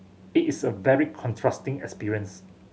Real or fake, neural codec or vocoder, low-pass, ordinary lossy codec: real; none; none; none